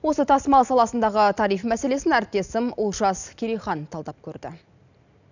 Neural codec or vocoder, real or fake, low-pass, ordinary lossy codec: none; real; 7.2 kHz; none